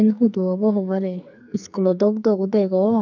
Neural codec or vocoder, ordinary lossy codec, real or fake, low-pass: codec, 44.1 kHz, 2.6 kbps, SNAC; none; fake; 7.2 kHz